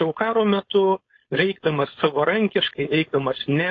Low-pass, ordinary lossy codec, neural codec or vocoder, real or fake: 7.2 kHz; AAC, 32 kbps; codec, 16 kHz, 4.8 kbps, FACodec; fake